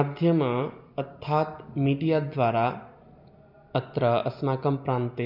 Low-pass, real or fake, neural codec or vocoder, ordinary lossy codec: 5.4 kHz; real; none; none